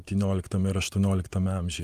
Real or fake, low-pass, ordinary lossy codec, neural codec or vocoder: fake; 14.4 kHz; Opus, 32 kbps; vocoder, 44.1 kHz, 128 mel bands every 512 samples, BigVGAN v2